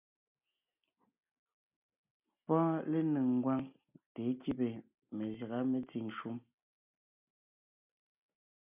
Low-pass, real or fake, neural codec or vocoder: 3.6 kHz; real; none